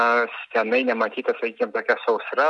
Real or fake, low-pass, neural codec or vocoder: real; 10.8 kHz; none